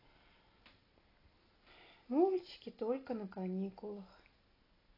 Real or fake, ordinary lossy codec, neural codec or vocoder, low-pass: real; AAC, 24 kbps; none; 5.4 kHz